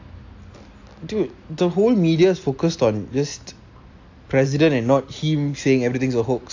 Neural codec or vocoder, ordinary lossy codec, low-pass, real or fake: none; AAC, 48 kbps; 7.2 kHz; real